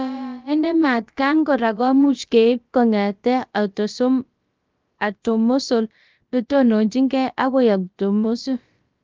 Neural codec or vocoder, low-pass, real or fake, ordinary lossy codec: codec, 16 kHz, about 1 kbps, DyCAST, with the encoder's durations; 7.2 kHz; fake; Opus, 24 kbps